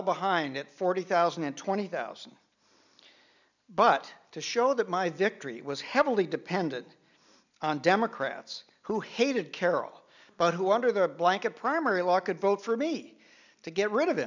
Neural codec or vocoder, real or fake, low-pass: none; real; 7.2 kHz